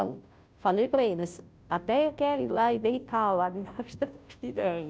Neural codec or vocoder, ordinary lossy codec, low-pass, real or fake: codec, 16 kHz, 0.5 kbps, FunCodec, trained on Chinese and English, 25 frames a second; none; none; fake